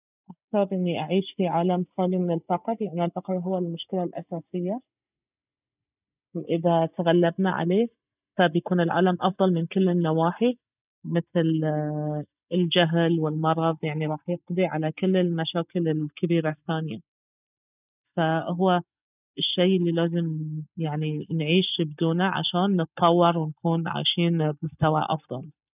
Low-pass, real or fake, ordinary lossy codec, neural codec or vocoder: 3.6 kHz; real; none; none